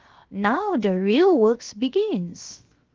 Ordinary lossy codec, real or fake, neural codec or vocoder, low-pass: Opus, 32 kbps; fake; codec, 16 kHz, 0.7 kbps, FocalCodec; 7.2 kHz